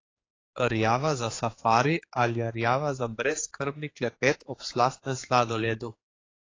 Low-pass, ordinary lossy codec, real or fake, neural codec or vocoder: 7.2 kHz; AAC, 32 kbps; fake; codec, 16 kHz, 4 kbps, X-Codec, HuBERT features, trained on general audio